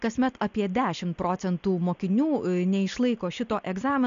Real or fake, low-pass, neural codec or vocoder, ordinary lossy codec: real; 7.2 kHz; none; AAC, 64 kbps